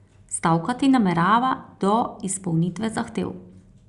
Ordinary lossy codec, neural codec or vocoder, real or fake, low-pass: Opus, 64 kbps; none; real; 10.8 kHz